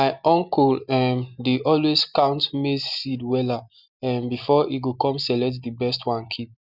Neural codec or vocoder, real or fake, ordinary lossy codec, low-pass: none; real; Opus, 64 kbps; 5.4 kHz